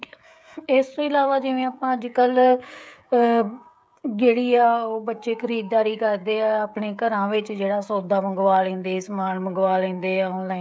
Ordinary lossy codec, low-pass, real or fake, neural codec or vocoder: none; none; fake; codec, 16 kHz, 16 kbps, FreqCodec, smaller model